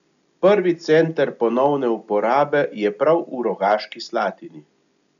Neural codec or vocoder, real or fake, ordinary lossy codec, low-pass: none; real; none; 7.2 kHz